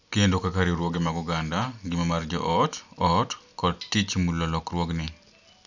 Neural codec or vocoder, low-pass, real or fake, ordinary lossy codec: none; 7.2 kHz; real; none